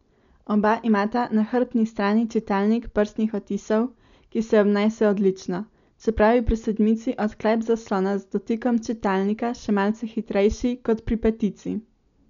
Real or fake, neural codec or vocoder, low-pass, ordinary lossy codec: real; none; 7.2 kHz; none